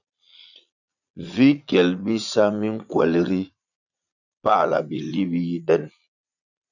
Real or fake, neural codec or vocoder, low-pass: fake; vocoder, 22.05 kHz, 80 mel bands, Vocos; 7.2 kHz